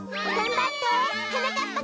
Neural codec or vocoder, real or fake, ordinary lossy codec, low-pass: none; real; none; none